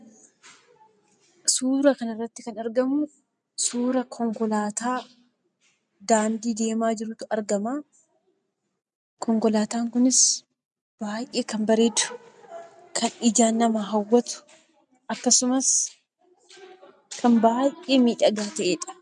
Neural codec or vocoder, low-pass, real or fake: none; 10.8 kHz; real